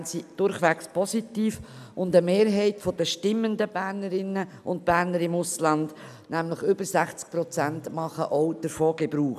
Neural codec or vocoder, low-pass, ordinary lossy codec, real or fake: none; 14.4 kHz; none; real